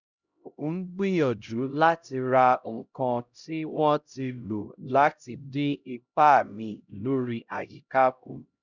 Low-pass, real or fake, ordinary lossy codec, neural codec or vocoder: 7.2 kHz; fake; none; codec, 16 kHz, 0.5 kbps, X-Codec, HuBERT features, trained on LibriSpeech